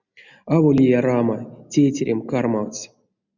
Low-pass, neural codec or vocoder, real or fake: 7.2 kHz; none; real